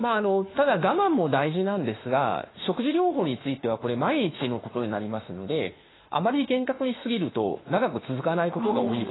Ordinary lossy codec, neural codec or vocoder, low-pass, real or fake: AAC, 16 kbps; autoencoder, 48 kHz, 32 numbers a frame, DAC-VAE, trained on Japanese speech; 7.2 kHz; fake